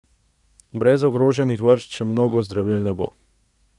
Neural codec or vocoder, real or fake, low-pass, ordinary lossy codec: codec, 24 kHz, 1 kbps, SNAC; fake; 10.8 kHz; none